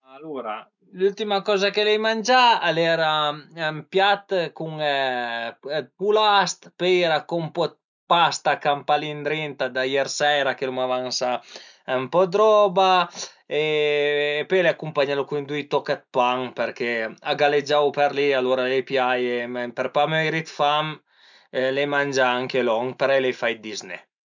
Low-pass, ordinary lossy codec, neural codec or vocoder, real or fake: 7.2 kHz; none; none; real